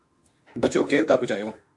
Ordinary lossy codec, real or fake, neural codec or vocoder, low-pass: AAC, 64 kbps; fake; autoencoder, 48 kHz, 32 numbers a frame, DAC-VAE, trained on Japanese speech; 10.8 kHz